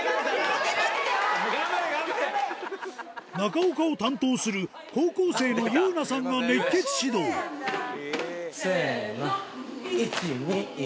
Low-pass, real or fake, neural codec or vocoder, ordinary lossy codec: none; real; none; none